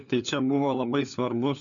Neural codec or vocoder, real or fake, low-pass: codec, 16 kHz, 4 kbps, FunCodec, trained on LibriTTS, 50 frames a second; fake; 7.2 kHz